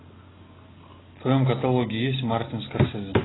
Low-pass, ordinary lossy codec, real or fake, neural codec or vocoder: 7.2 kHz; AAC, 16 kbps; real; none